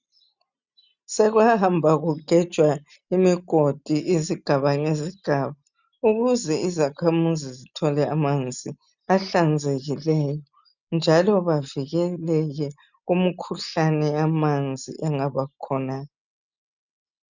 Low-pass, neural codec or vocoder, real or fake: 7.2 kHz; none; real